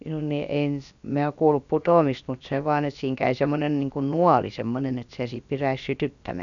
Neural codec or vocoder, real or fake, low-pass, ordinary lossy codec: codec, 16 kHz, about 1 kbps, DyCAST, with the encoder's durations; fake; 7.2 kHz; none